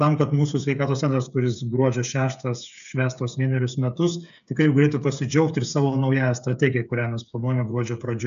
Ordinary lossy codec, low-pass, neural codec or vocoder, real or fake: AAC, 96 kbps; 7.2 kHz; codec, 16 kHz, 8 kbps, FreqCodec, smaller model; fake